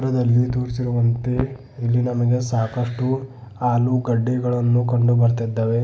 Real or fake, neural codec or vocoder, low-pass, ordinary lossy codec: real; none; none; none